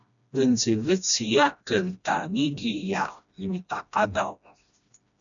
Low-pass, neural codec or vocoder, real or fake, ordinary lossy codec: 7.2 kHz; codec, 16 kHz, 1 kbps, FreqCodec, smaller model; fake; MP3, 96 kbps